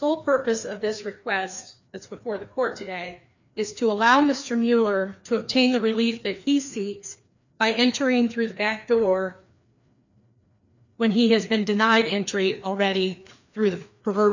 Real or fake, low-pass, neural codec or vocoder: fake; 7.2 kHz; codec, 16 kHz, 2 kbps, FreqCodec, larger model